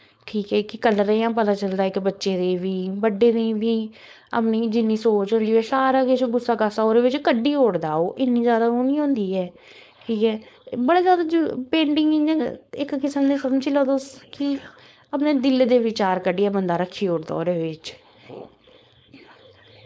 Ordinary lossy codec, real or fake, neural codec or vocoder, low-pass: none; fake; codec, 16 kHz, 4.8 kbps, FACodec; none